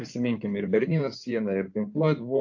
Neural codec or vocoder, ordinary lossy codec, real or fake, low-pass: codec, 16 kHz in and 24 kHz out, 2.2 kbps, FireRedTTS-2 codec; AAC, 48 kbps; fake; 7.2 kHz